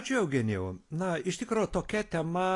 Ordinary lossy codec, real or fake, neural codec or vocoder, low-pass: AAC, 48 kbps; real; none; 10.8 kHz